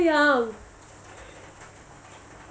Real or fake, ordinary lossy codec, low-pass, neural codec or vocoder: real; none; none; none